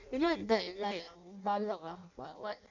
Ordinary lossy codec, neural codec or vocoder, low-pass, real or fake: none; codec, 16 kHz in and 24 kHz out, 0.6 kbps, FireRedTTS-2 codec; 7.2 kHz; fake